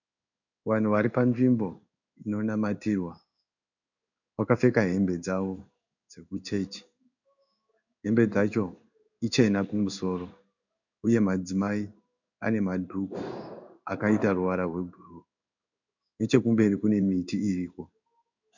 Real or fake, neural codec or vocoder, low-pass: fake; codec, 16 kHz in and 24 kHz out, 1 kbps, XY-Tokenizer; 7.2 kHz